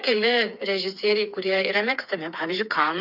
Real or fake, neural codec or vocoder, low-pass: fake; codec, 16 kHz, 4 kbps, FreqCodec, smaller model; 5.4 kHz